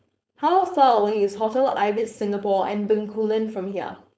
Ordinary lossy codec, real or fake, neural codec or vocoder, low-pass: none; fake; codec, 16 kHz, 4.8 kbps, FACodec; none